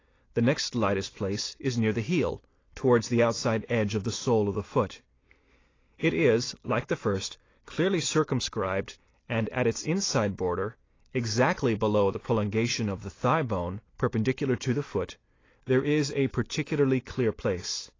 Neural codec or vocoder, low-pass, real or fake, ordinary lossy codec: none; 7.2 kHz; real; AAC, 32 kbps